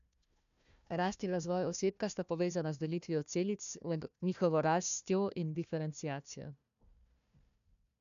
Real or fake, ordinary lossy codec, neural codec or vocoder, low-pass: fake; none; codec, 16 kHz, 1 kbps, FunCodec, trained on Chinese and English, 50 frames a second; 7.2 kHz